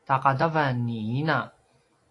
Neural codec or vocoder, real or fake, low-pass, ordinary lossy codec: none; real; 10.8 kHz; AAC, 48 kbps